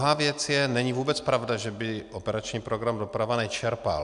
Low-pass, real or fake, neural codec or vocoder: 10.8 kHz; real; none